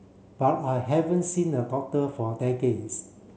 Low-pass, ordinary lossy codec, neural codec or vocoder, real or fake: none; none; none; real